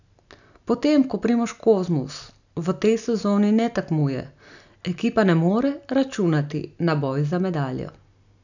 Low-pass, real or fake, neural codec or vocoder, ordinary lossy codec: 7.2 kHz; real; none; none